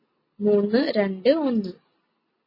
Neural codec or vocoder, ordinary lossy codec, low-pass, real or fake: none; MP3, 24 kbps; 5.4 kHz; real